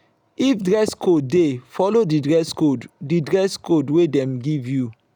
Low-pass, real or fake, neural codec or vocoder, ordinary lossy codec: 19.8 kHz; real; none; none